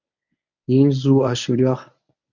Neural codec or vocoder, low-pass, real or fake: codec, 24 kHz, 0.9 kbps, WavTokenizer, medium speech release version 1; 7.2 kHz; fake